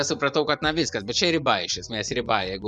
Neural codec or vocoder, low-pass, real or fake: vocoder, 24 kHz, 100 mel bands, Vocos; 10.8 kHz; fake